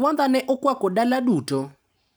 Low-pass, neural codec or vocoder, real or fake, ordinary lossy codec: none; none; real; none